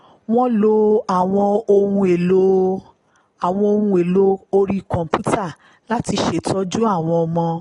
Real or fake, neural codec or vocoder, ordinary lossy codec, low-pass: real; none; AAC, 32 kbps; 10.8 kHz